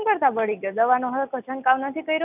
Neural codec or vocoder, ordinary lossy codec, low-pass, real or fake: none; none; 3.6 kHz; real